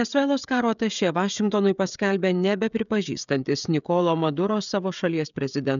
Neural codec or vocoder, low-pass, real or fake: codec, 16 kHz, 16 kbps, FreqCodec, smaller model; 7.2 kHz; fake